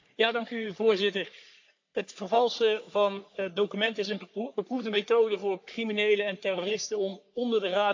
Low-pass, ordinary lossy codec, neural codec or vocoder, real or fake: 7.2 kHz; AAC, 48 kbps; codec, 44.1 kHz, 3.4 kbps, Pupu-Codec; fake